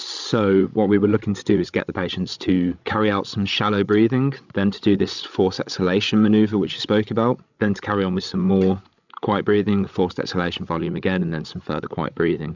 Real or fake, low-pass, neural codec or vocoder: fake; 7.2 kHz; codec, 16 kHz, 16 kbps, FreqCodec, larger model